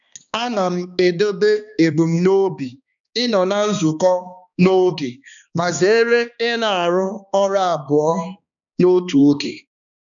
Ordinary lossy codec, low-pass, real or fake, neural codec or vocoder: none; 7.2 kHz; fake; codec, 16 kHz, 2 kbps, X-Codec, HuBERT features, trained on balanced general audio